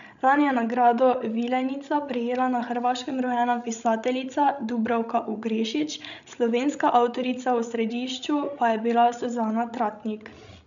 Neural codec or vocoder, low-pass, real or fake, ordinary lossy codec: codec, 16 kHz, 16 kbps, FreqCodec, larger model; 7.2 kHz; fake; none